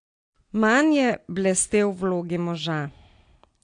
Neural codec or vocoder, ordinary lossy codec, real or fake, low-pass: none; AAC, 48 kbps; real; 9.9 kHz